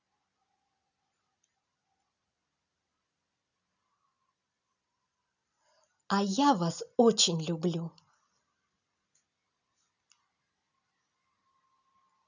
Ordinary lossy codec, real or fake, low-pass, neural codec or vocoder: none; real; 7.2 kHz; none